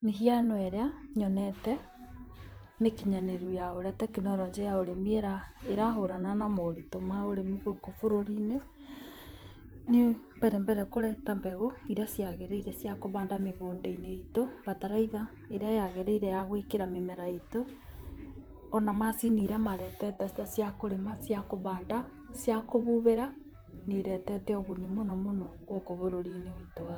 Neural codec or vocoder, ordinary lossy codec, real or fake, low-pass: vocoder, 44.1 kHz, 128 mel bands, Pupu-Vocoder; none; fake; none